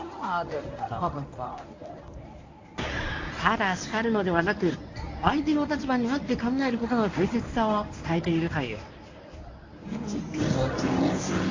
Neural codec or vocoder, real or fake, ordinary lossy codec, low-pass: codec, 24 kHz, 0.9 kbps, WavTokenizer, medium speech release version 1; fake; AAC, 48 kbps; 7.2 kHz